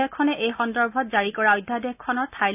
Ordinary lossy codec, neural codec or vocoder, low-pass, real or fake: none; none; 3.6 kHz; real